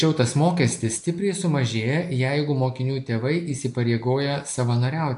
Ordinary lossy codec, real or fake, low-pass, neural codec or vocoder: AAC, 64 kbps; real; 10.8 kHz; none